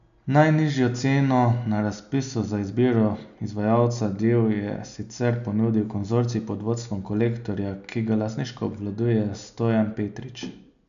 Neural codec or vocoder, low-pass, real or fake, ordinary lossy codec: none; 7.2 kHz; real; none